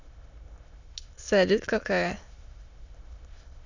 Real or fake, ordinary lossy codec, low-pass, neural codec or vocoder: fake; Opus, 64 kbps; 7.2 kHz; autoencoder, 22.05 kHz, a latent of 192 numbers a frame, VITS, trained on many speakers